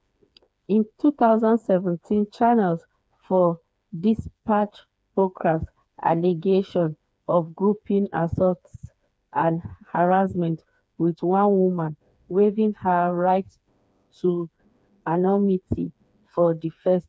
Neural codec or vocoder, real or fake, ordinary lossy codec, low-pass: codec, 16 kHz, 4 kbps, FreqCodec, smaller model; fake; none; none